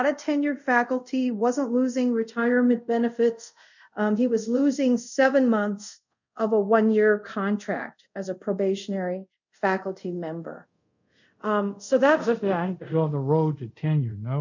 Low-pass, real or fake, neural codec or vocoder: 7.2 kHz; fake; codec, 24 kHz, 0.5 kbps, DualCodec